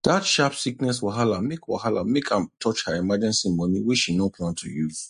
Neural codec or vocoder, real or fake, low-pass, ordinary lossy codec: none; real; 14.4 kHz; MP3, 48 kbps